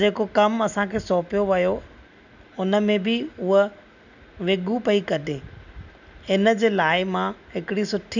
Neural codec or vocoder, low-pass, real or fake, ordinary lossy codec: none; 7.2 kHz; real; none